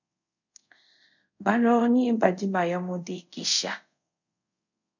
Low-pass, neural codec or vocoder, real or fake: 7.2 kHz; codec, 24 kHz, 0.5 kbps, DualCodec; fake